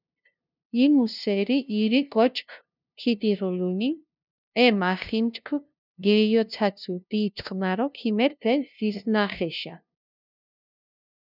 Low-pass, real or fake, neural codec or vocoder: 5.4 kHz; fake; codec, 16 kHz, 0.5 kbps, FunCodec, trained on LibriTTS, 25 frames a second